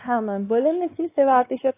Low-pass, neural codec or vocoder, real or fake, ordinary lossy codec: 3.6 kHz; codec, 16 kHz, 2 kbps, X-Codec, WavLM features, trained on Multilingual LibriSpeech; fake; AAC, 24 kbps